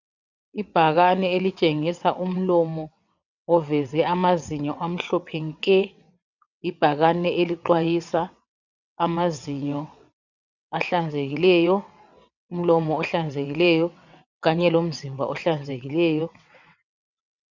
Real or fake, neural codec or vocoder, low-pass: fake; vocoder, 22.05 kHz, 80 mel bands, WaveNeXt; 7.2 kHz